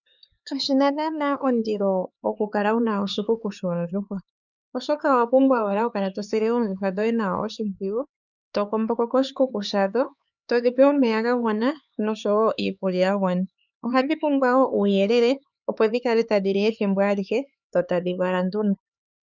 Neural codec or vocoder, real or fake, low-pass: codec, 16 kHz, 4 kbps, X-Codec, HuBERT features, trained on LibriSpeech; fake; 7.2 kHz